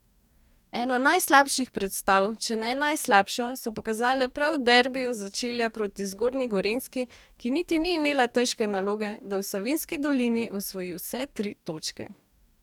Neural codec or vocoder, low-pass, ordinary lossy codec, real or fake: codec, 44.1 kHz, 2.6 kbps, DAC; 19.8 kHz; none; fake